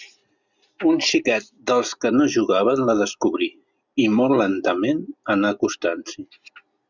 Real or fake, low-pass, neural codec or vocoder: real; 7.2 kHz; none